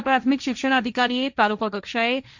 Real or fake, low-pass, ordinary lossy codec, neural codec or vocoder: fake; 7.2 kHz; MP3, 64 kbps; codec, 16 kHz, 1.1 kbps, Voila-Tokenizer